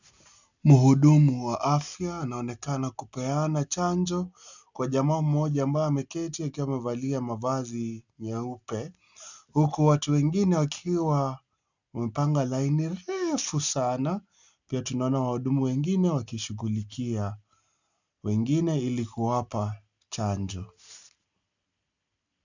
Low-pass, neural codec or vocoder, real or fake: 7.2 kHz; none; real